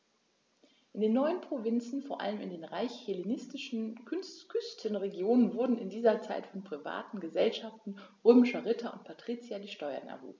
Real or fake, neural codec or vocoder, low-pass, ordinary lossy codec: real; none; none; none